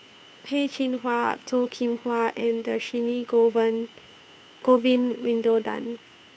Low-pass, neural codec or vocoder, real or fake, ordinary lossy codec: none; codec, 16 kHz, 2 kbps, FunCodec, trained on Chinese and English, 25 frames a second; fake; none